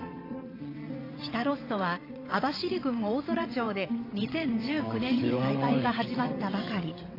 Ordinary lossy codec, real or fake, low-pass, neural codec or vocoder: AAC, 24 kbps; fake; 5.4 kHz; vocoder, 22.05 kHz, 80 mel bands, WaveNeXt